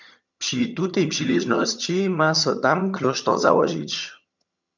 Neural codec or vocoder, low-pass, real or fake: vocoder, 22.05 kHz, 80 mel bands, HiFi-GAN; 7.2 kHz; fake